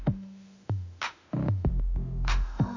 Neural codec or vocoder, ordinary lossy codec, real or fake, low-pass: autoencoder, 48 kHz, 32 numbers a frame, DAC-VAE, trained on Japanese speech; none; fake; 7.2 kHz